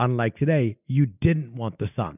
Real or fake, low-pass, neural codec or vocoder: real; 3.6 kHz; none